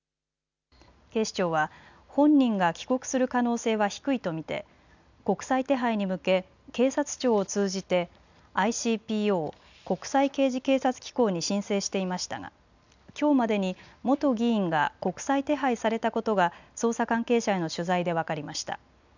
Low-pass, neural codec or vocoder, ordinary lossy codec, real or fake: 7.2 kHz; none; none; real